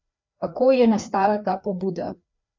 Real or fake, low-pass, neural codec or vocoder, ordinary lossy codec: fake; 7.2 kHz; codec, 16 kHz, 2 kbps, FreqCodec, larger model; MP3, 64 kbps